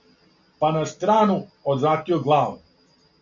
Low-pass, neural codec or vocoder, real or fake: 7.2 kHz; none; real